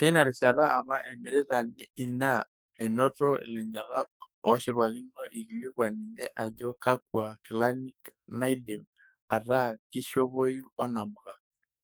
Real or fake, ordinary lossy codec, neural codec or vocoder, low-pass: fake; none; codec, 44.1 kHz, 2.6 kbps, SNAC; none